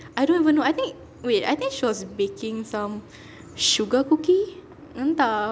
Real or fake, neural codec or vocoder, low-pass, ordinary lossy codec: real; none; none; none